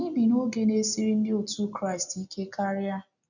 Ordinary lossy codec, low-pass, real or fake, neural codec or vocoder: none; 7.2 kHz; real; none